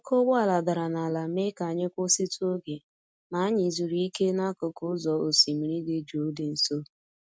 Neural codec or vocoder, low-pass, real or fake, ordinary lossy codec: none; none; real; none